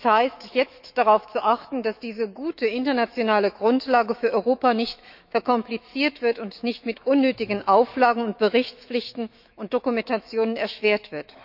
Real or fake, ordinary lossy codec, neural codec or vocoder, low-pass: fake; none; autoencoder, 48 kHz, 128 numbers a frame, DAC-VAE, trained on Japanese speech; 5.4 kHz